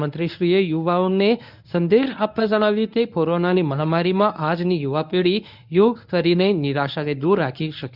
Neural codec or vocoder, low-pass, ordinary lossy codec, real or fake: codec, 24 kHz, 0.9 kbps, WavTokenizer, medium speech release version 1; 5.4 kHz; none; fake